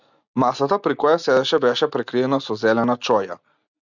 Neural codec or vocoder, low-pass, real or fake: none; 7.2 kHz; real